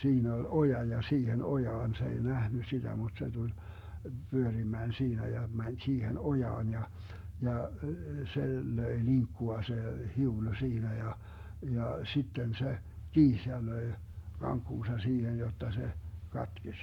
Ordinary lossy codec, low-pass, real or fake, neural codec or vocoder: none; 19.8 kHz; fake; codec, 44.1 kHz, 7.8 kbps, Pupu-Codec